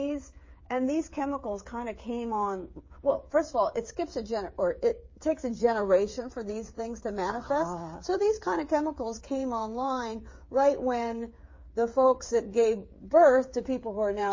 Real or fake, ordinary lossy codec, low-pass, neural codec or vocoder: fake; MP3, 32 kbps; 7.2 kHz; codec, 16 kHz, 8 kbps, FreqCodec, smaller model